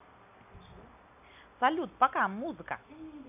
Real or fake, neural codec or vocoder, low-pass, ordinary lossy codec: real; none; 3.6 kHz; none